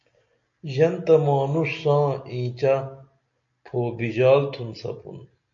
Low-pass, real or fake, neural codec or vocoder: 7.2 kHz; real; none